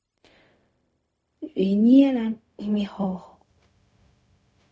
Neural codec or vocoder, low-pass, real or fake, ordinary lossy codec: codec, 16 kHz, 0.4 kbps, LongCat-Audio-Codec; none; fake; none